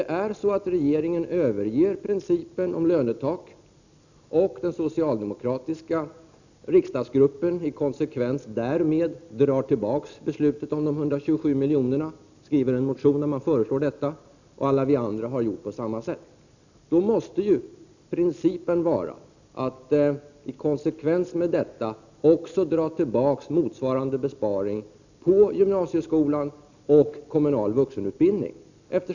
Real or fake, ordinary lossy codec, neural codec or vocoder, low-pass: real; none; none; 7.2 kHz